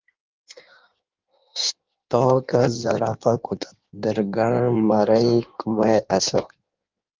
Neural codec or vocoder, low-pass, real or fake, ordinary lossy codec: codec, 16 kHz in and 24 kHz out, 1.1 kbps, FireRedTTS-2 codec; 7.2 kHz; fake; Opus, 32 kbps